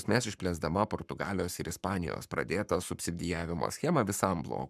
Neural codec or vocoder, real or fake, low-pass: codec, 44.1 kHz, 7.8 kbps, Pupu-Codec; fake; 14.4 kHz